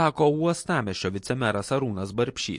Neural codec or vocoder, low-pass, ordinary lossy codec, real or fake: none; 10.8 kHz; MP3, 48 kbps; real